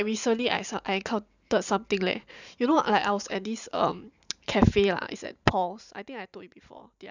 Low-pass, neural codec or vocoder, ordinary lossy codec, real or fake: 7.2 kHz; vocoder, 44.1 kHz, 128 mel bands every 512 samples, BigVGAN v2; none; fake